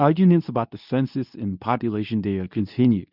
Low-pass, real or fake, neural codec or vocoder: 5.4 kHz; fake; codec, 24 kHz, 0.9 kbps, WavTokenizer, medium speech release version 2